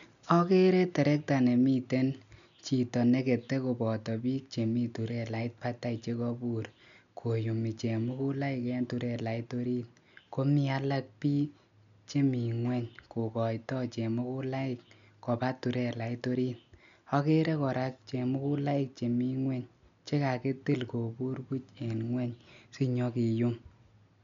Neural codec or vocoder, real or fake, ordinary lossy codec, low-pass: none; real; none; 7.2 kHz